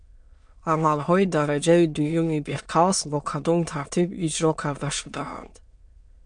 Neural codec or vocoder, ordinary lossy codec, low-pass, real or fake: autoencoder, 22.05 kHz, a latent of 192 numbers a frame, VITS, trained on many speakers; MP3, 64 kbps; 9.9 kHz; fake